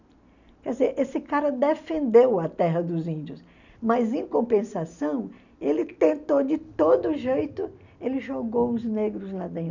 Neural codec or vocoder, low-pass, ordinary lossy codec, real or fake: none; 7.2 kHz; none; real